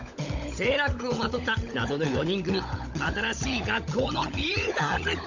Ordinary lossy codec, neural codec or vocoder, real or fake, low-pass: none; codec, 16 kHz, 16 kbps, FunCodec, trained on LibriTTS, 50 frames a second; fake; 7.2 kHz